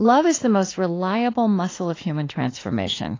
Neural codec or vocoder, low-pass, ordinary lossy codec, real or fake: autoencoder, 48 kHz, 32 numbers a frame, DAC-VAE, trained on Japanese speech; 7.2 kHz; AAC, 32 kbps; fake